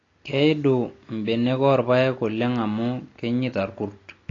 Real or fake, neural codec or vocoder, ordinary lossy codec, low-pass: real; none; AAC, 32 kbps; 7.2 kHz